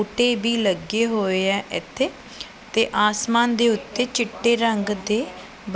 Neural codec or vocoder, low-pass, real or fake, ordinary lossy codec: none; none; real; none